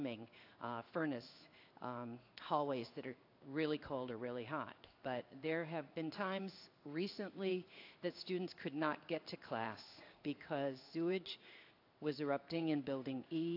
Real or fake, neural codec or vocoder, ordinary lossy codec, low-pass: fake; codec, 16 kHz in and 24 kHz out, 1 kbps, XY-Tokenizer; AAC, 32 kbps; 5.4 kHz